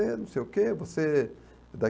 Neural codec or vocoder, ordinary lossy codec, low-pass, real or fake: none; none; none; real